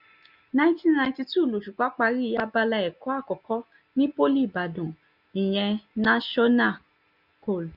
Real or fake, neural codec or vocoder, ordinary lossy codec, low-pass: real; none; none; 5.4 kHz